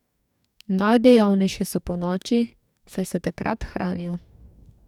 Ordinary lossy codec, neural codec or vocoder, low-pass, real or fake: none; codec, 44.1 kHz, 2.6 kbps, DAC; 19.8 kHz; fake